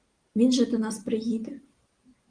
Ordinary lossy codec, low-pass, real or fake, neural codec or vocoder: Opus, 24 kbps; 9.9 kHz; real; none